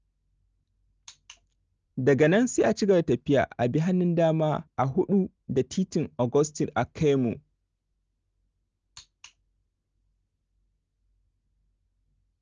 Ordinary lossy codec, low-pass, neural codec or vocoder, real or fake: Opus, 24 kbps; 7.2 kHz; none; real